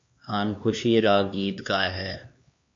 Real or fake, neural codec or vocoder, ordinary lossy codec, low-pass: fake; codec, 16 kHz, 2 kbps, X-Codec, HuBERT features, trained on LibriSpeech; MP3, 48 kbps; 7.2 kHz